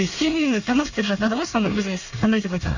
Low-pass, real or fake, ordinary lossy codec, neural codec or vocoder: 7.2 kHz; fake; none; codec, 24 kHz, 1 kbps, SNAC